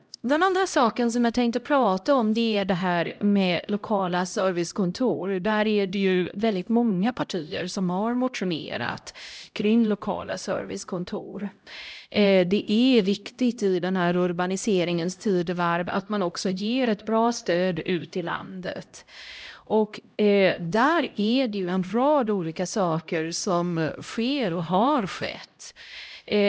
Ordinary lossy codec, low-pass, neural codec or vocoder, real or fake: none; none; codec, 16 kHz, 0.5 kbps, X-Codec, HuBERT features, trained on LibriSpeech; fake